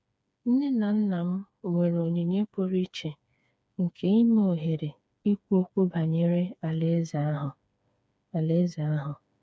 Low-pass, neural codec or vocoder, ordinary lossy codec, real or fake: none; codec, 16 kHz, 4 kbps, FreqCodec, smaller model; none; fake